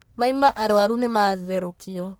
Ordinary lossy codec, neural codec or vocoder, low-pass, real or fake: none; codec, 44.1 kHz, 1.7 kbps, Pupu-Codec; none; fake